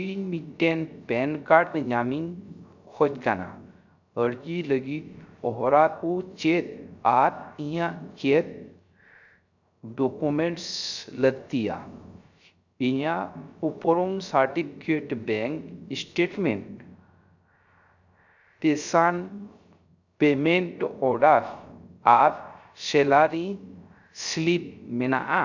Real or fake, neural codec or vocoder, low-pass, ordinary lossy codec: fake; codec, 16 kHz, 0.3 kbps, FocalCodec; 7.2 kHz; none